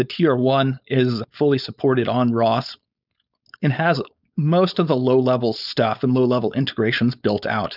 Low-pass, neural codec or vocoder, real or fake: 5.4 kHz; codec, 16 kHz, 4.8 kbps, FACodec; fake